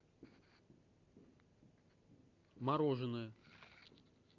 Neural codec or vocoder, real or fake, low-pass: none; real; 7.2 kHz